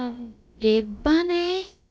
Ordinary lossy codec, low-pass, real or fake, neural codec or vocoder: none; none; fake; codec, 16 kHz, about 1 kbps, DyCAST, with the encoder's durations